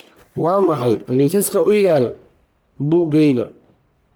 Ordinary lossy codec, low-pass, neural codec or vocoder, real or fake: none; none; codec, 44.1 kHz, 1.7 kbps, Pupu-Codec; fake